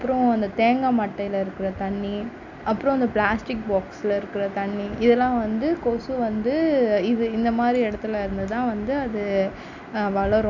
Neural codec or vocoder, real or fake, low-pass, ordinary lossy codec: none; real; 7.2 kHz; none